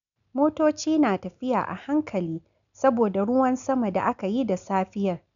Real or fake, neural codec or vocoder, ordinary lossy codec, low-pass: real; none; none; 7.2 kHz